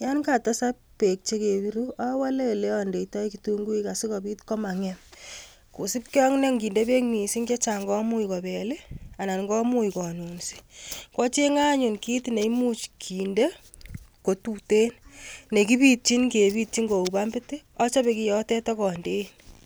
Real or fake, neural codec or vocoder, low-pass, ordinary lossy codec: real; none; none; none